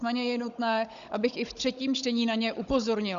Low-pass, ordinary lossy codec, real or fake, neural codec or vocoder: 7.2 kHz; Opus, 64 kbps; fake; codec, 16 kHz, 16 kbps, FunCodec, trained on Chinese and English, 50 frames a second